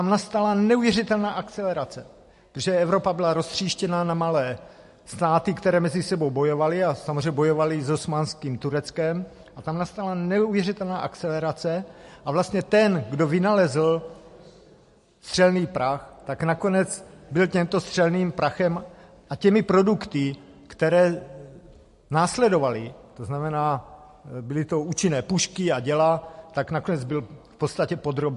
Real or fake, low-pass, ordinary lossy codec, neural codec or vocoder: real; 14.4 kHz; MP3, 48 kbps; none